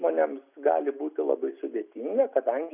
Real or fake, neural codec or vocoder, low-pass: real; none; 3.6 kHz